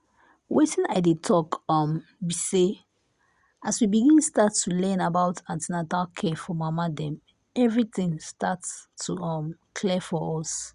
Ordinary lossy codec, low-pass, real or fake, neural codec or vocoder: Opus, 64 kbps; 10.8 kHz; real; none